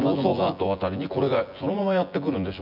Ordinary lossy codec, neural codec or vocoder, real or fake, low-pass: none; vocoder, 24 kHz, 100 mel bands, Vocos; fake; 5.4 kHz